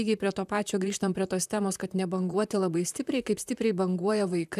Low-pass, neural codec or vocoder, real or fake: 14.4 kHz; vocoder, 44.1 kHz, 128 mel bands, Pupu-Vocoder; fake